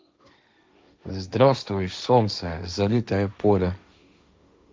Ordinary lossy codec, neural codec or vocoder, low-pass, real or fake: none; codec, 16 kHz, 1.1 kbps, Voila-Tokenizer; none; fake